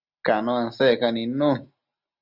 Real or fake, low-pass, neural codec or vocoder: real; 5.4 kHz; none